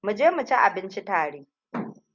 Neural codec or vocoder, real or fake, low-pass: none; real; 7.2 kHz